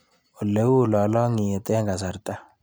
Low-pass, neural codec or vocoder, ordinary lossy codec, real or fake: none; none; none; real